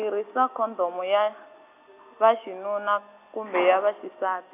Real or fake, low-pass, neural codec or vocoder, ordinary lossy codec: real; 3.6 kHz; none; none